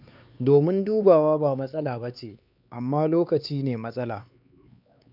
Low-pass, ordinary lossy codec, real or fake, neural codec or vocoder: 5.4 kHz; none; fake; codec, 16 kHz, 4 kbps, X-Codec, HuBERT features, trained on LibriSpeech